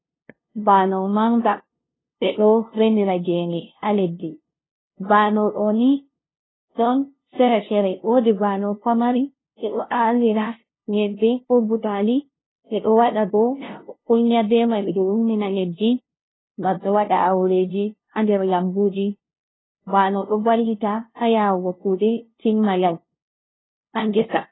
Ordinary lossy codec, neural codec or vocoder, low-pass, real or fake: AAC, 16 kbps; codec, 16 kHz, 0.5 kbps, FunCodec, trained on LibriTTS, 25 frames a second; 7.2 kHz; fake